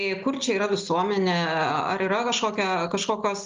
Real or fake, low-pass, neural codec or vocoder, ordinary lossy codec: real; 7.2 kHz; none; Opus, 32 kbps